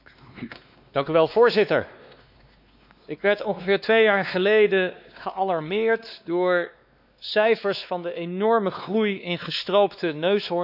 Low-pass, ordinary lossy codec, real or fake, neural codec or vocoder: 5.4 kHz; none; fake; codec, 16 kHz, 2 kbps, X-Codec, WavLM features, trained on Multilingual LibriSpeech